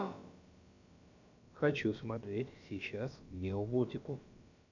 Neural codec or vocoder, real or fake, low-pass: codec, 16 kHz, about 1 kbps, DyCAST, with the encoder's durations; fake; 7.2 kHz